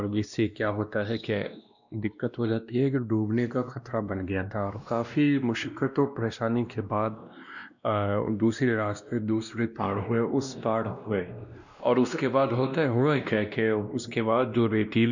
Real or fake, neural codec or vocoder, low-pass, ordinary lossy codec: fake; codec, 16 kHz, 1 kbps, X-Codec, WavLM features, trained on Multilingual LibriSpeech; 7.2 kHz; none